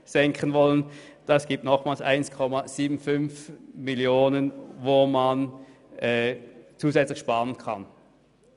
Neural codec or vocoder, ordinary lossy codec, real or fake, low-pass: none; none; real; 10.8 kHz